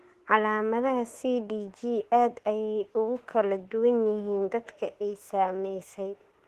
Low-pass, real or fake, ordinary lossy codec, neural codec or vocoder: 14.4 kHz; fake; Opus, 16 kbps; autoencoder, 48 kHz, 32 numbers a frame, DAC-VAE, trained on Japanese speech